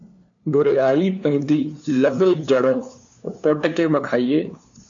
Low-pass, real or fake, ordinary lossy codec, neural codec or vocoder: 7.2 kHz; fake; MP3, 64 kbps; codec, 16 kHz, 2 kbps, FunCodec, trained on LibriTTS, 25 frames a second